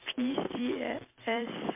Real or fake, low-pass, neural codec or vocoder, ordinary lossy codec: fake; 3.6 kHz; vocoder, 44.1 kHz, 128 mel bands every 256 samples, BigVGAN v2; MP3, 24 kbps